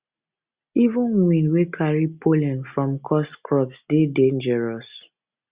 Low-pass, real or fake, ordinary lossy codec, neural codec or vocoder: 3.6 kHz; real; Opus, 64 kbps; none